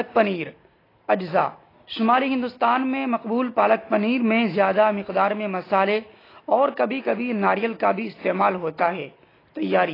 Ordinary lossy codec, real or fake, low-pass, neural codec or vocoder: AAC, 24 kbps; real; 5.4 kHz; none